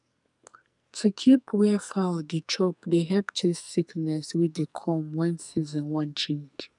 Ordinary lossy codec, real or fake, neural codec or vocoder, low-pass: none; fake; codec, 44.1 kHz, 2.6 kbps, SNAC; 10.8 kHz